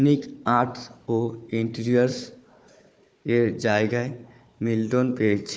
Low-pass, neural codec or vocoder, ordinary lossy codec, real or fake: none; codec, 16 kHz, 4 kbps, FunCodec, trained on Chinese and English, 50 frames a second; none; fake